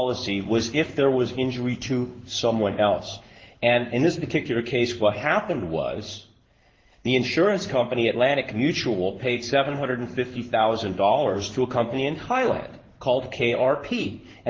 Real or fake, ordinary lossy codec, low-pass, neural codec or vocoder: fake; Opus, 16 kbps; 7.2 kHz; codec, 16 kHz in and 24 kHz out, 1 kbps, XY-Tokenizer